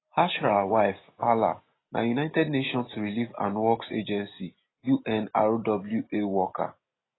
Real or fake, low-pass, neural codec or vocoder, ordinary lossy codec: real; 7.2 kHz; none; AAC, 16 kbps